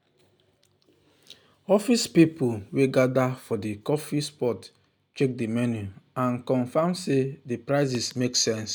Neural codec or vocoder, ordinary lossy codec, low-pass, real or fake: none; none; none; real